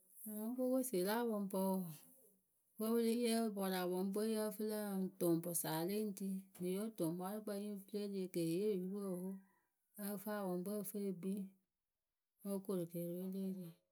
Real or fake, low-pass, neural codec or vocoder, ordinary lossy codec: real; none; none; none